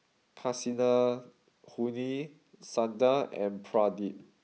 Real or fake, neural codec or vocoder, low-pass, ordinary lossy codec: real; none; none; none